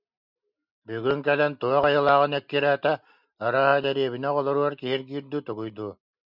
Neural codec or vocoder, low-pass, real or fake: none; 5.4 kHz; real